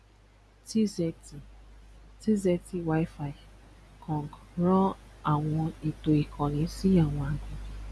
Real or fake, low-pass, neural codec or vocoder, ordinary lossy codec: real; none; none; none